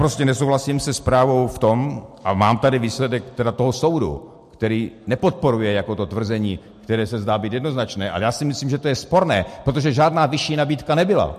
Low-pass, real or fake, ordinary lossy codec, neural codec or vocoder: 14.4 kHz; real; MP3, 64 kbps; none